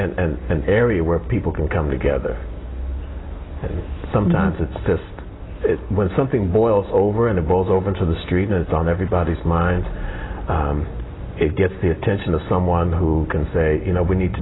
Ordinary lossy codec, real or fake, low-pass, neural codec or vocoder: AAC, 16 kbps; real; 7.2 kHz; none